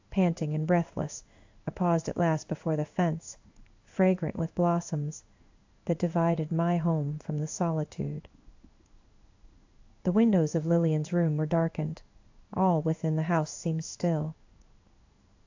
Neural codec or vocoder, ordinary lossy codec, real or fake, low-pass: codec, 16 kHz in and 24 kHz out, 1 kbps, XY-Tokenizer; AAC, 48 kbps; fake; 7.2 kHz